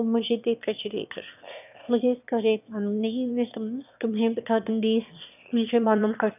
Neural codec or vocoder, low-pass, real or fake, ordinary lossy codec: autoencoder, 22.05 kHz, a latent of 192 numbers a frame, VITS, trained on one speaker; 3.6 kHz; fake; none